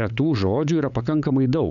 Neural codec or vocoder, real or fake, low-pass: codec, 16 kHz, 8 kbps, FunCodec, trained on LibriTTS, 25 frames a second; fake; 7.2 kHz